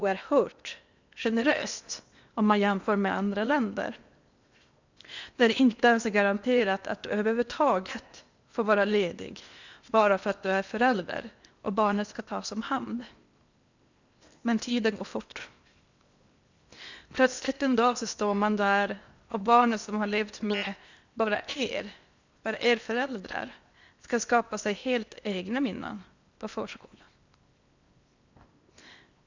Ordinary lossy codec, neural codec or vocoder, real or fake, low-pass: Opus, 64 kbps; codec, 16 kHz in and 24 kHz out, 0.8 kbps, FocalCodec, streaming, 65536 codes; fake; 7.2 kHz